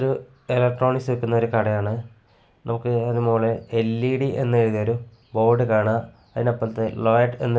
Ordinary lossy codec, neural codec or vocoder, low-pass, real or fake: none; none; none; real